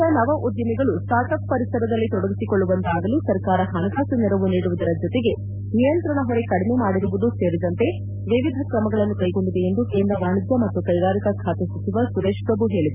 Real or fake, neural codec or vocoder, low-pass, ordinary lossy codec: real; none; 3.6 kHz; none